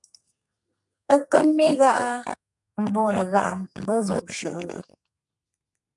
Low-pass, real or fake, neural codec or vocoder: 10.8 kHz; fake; codec, 32 kHz, 1.9 kbps, SNAC